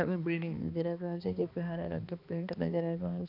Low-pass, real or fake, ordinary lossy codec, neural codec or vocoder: 5.4 kHz; fake; none; codec, 16 kHz, 2 kbps, X-Codec, HuBERT features, trained on balanced general audio